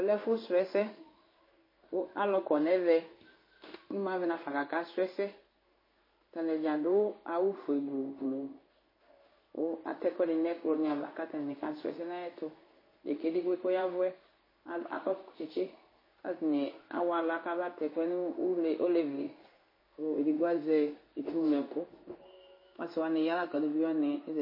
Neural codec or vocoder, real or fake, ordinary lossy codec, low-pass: codec, 16 kHz in and 24 kHz out, 1 kbps, XY-Tokenizer; fake; MP3, 24 kbps; 5.4 kHz